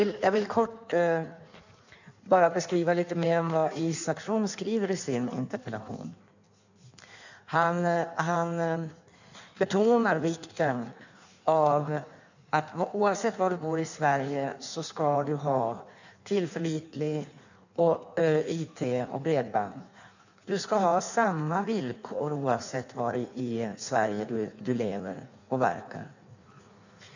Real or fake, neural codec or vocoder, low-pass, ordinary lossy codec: fake; codec, 16 kHz in and 24 kHz out, 1.1 kbps, FireRedTTS-2 codec; 7.2 kHz; none